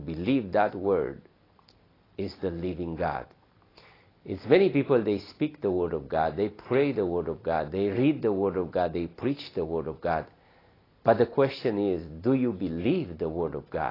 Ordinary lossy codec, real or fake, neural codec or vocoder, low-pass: AAC, 24 kbps; real; none; 5.4 kHz